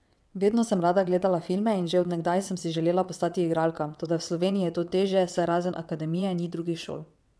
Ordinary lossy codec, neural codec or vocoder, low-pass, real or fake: none; vocoder, 22.05 kHz, 80 mel bands, WaveNeXt; none; fake